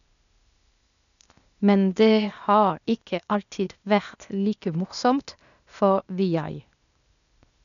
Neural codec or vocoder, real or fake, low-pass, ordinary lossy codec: codec, 16 kHz, 0.8 kbps, ZipCodec; fake; 7.2 kHz; none